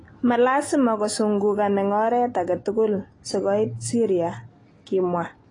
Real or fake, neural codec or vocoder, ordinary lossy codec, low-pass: real; none; AAC, 32 kbps; 10.8 kHz